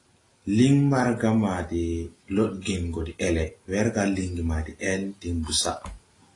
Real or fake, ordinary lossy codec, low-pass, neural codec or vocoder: real; AAC, 32 kbps; 10.8 kHz; none